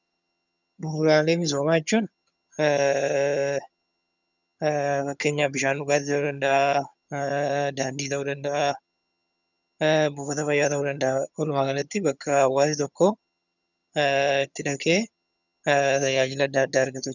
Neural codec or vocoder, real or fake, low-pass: vocoder, 22.05 kHz, 80 mel bands, HiFi-GAN; fake; 7.2 kHz